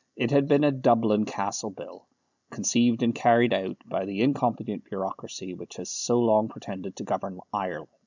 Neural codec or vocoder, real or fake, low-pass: none; real; 7.2 kHz